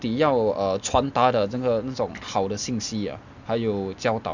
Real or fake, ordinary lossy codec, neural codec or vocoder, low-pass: real; none; none; 7.2 kHz